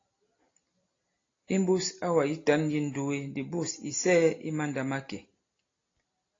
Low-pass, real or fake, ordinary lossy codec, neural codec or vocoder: 7.2 kHz; real; AAC, 32 kbps; none